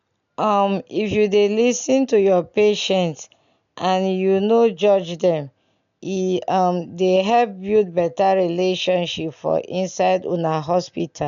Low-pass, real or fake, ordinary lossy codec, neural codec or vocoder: 7.2 kHz; real; none; none